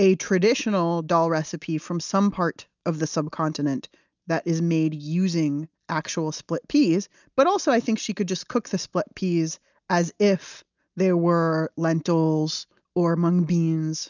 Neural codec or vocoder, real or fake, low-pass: none; real; 7.2 kHz